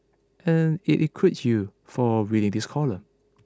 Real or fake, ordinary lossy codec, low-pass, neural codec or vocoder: real; none; none; none